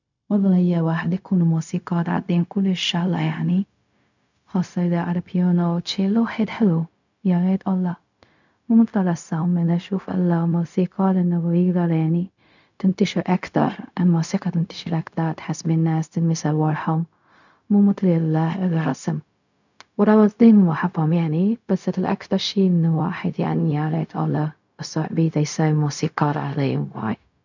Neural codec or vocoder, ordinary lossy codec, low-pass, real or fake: codec, 16 kHz, 0.4 kbps, LongCat-Audio-Codec; none; 7.2 kHz; fake